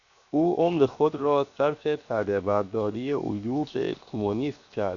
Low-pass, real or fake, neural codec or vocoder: 7.2 kHz; fake; codec, 16 kHz, 0.7 kbps, FocalCodec